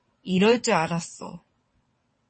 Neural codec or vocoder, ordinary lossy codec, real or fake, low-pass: vocoder, 22.05 kHz, 80 mel bands, WaveNeXt; MP3, 32 kbps; fake; 9.9 kHz